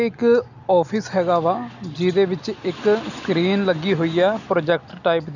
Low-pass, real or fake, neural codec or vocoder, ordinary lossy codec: 7.2 kHz; real; none; none